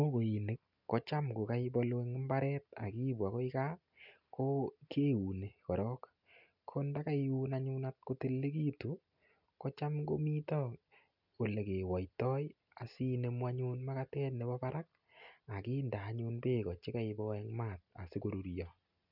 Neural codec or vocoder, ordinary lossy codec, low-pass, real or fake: none; none; 5.4 kHz; real